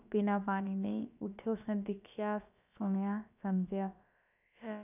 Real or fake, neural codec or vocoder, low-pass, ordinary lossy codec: fake; codec, 16 kHz, about 1 kbps, DyCAST, with the encoder's durations; 3.6 kHz; none